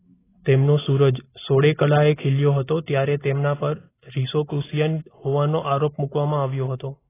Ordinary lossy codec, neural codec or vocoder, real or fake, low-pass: AAC, 16 kbps; none; real; 3.6 kHz